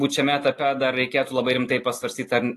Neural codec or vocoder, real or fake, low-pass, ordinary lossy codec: none; real; 14.4 kHz; AAC, 48 kbps